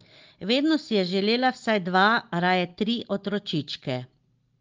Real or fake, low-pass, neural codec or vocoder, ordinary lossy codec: real; 7.2 kHz; none; Opus, 24 kbps